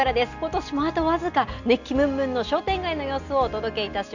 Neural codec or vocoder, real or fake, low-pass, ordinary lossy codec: none; real; 7.2 kHz; none